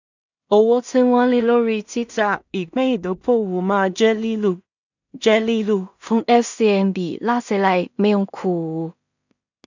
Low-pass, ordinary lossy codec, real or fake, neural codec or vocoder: 7.2 kHz; none; fake; codec, 16 kHz in and 24 kHz out, 0.4 kbps, LongCat-Audio-Codec, two codebook decoder